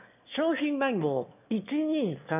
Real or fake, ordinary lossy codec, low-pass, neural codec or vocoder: fake; none; 3.6 kHz; autoencoder, 22.05 kHz, a latent of 192 numbers a frame, VITS, trained on one speaker